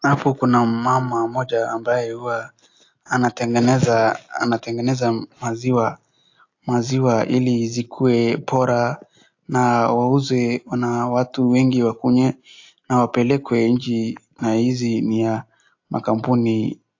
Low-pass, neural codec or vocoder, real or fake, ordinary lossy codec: 7.2 kHz; none; real; AAC, 48 kbps